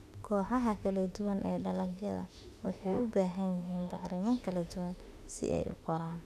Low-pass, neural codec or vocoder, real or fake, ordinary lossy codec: 14.4 kHz; autoencoder, 48 kHz, 32 numbers a frame, DAC-VAE, trained on Japanese speech; fake; none